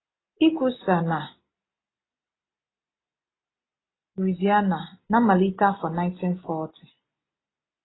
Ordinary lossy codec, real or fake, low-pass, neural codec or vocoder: AAC, 16 kbps; real; 7.2 kHz; none